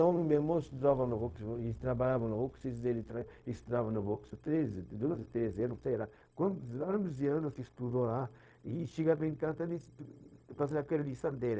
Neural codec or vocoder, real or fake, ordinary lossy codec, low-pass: codec, 16 kHz, 0.4 kbps, LongCat-Audio-Codec; fake; none; none